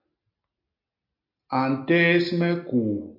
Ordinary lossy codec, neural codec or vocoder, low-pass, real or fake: AAC, 32 kbps; none; 5.4 kHz; real